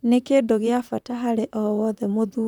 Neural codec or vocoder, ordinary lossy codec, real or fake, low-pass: vocoder, 44.1 kHz, 128 mel bands, Pupu-Vocoder; none; fake; 19.8 kHz